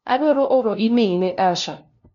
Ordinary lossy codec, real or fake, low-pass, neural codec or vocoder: none; fake; 7.2 kHz; codec, 16 kHz, 0.5 kbps, FunCodec, trained on LibriTTS, 25 frames a second